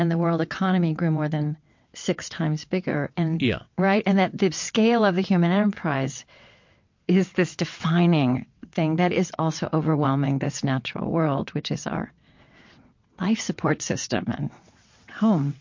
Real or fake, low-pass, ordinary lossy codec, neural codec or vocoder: fake; 7.2 kHz; MP3, 48 kbps; vocoder, 22.05 kHz, 80 mel bands, WaveNeXt